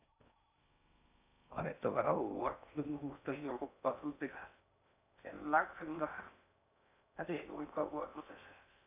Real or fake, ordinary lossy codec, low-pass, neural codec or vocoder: fake; none; 3.6 kHz; codec, 16 kHz in and 24 kHz out, 0.6 kbps, FocalCodec, streaming, 4096 codes